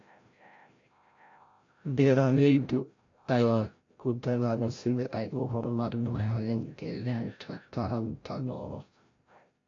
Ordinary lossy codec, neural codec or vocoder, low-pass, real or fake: AAC, 48 kbps; codec, 16 kHz, 0.5 kbps, FreqCodec, larger model; 7.2 kHz; fake